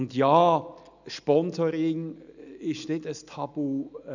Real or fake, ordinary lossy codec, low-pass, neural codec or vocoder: real; none; 7.2 kHz; none